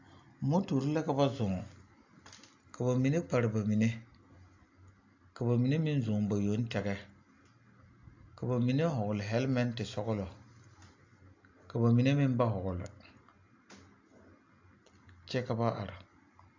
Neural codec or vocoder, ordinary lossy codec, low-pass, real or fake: none; AAC, 48 kbps; 7.2 kHz; real